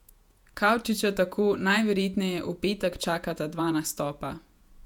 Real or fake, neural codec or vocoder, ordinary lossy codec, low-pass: fake; vocoder, 48 kHz, 128 mel bands, Vocos; none; 19.8 kHz